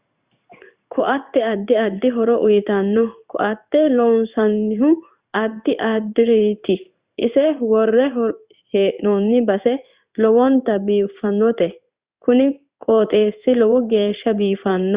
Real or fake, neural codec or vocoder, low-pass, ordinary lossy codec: fake; codec, 16 kHz in and 24 kHz out, 1 kbps, XY-Tokenizer; 3.6 kHz; Opus, 64 kbps